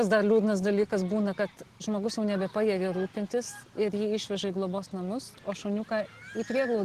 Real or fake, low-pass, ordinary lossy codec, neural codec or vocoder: real; 14.4 kHz; Opus, 16 kbps; none